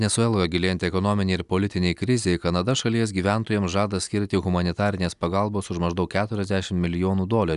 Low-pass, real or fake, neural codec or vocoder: 10.8 kHz; real; none